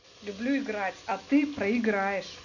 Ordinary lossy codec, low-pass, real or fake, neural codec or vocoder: none; 7.2 kHz; real; none